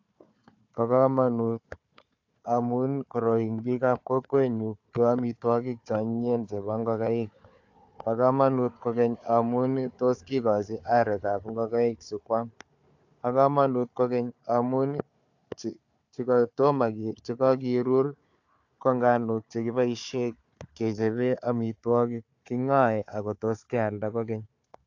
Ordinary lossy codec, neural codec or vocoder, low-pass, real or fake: AAC, 48 kbps; codec, 16 kHz, 4 kbps, FunCodec, trained on Chinese and English, 50 frames a second; 7.2 kHz; fake